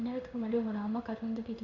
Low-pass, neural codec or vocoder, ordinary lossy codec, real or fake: 7.2 kHz; codec, 16 kHz in and 24 kHz out, 1 kbps, XY-Tokenizer; none; fake